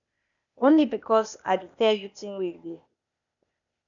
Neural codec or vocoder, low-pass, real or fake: codec, 16 kHz, 0.8 kbps, ZipCodec; 7.2 kHz; fake